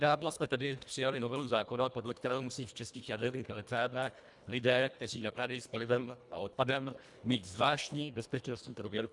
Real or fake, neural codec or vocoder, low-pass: fake; codec, 24 kHz, 1.5 kbps, HILCodec; 10.8 kHz